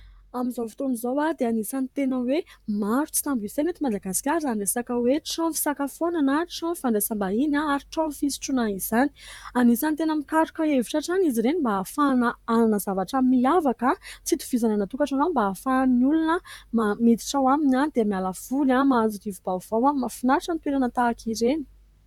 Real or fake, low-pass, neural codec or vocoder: fake; 19.8 kHz; vocoder, 44.1 kHz, 128 mel bands, Pupu-Vocoder